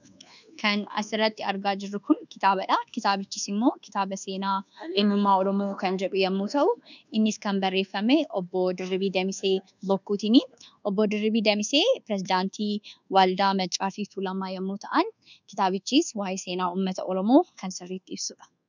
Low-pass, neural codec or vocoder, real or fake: 7.2 kHz; codec, 24 kHz, 1.2 kbps, DualCodec; fake